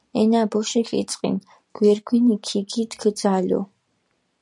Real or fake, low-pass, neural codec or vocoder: real; 10.8 kHz; none